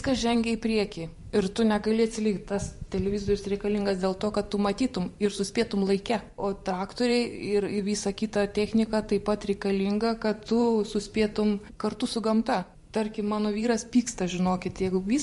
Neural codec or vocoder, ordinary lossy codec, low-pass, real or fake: vocoder, 44.1 kHz, 128 mel bands every 512 samples, BigVGAN v2; MP3, 48 kbps; 14.4 kHz; fake